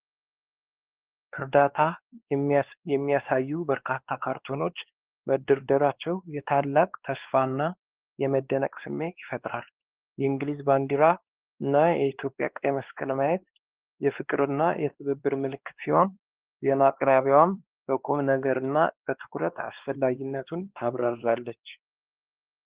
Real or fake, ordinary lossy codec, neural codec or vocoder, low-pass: fake; Opus, 16 kbps; codec, 16 kHz, 2 kbps, X-Codec, WavLM features, trained on Multilingual LibriSpeech; 3.6 kHz